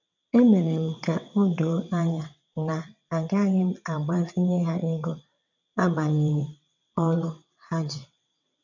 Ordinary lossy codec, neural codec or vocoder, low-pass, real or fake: none; vocoder, 22.05 kHz, 80 mel bands, WaveNeXt; 7.2 kHz; fake